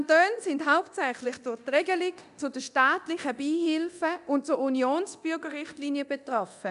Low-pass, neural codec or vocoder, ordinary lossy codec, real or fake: 10.8 kHz; codec, 24 kHz, 0.9 kbps, DualCodec; none; fake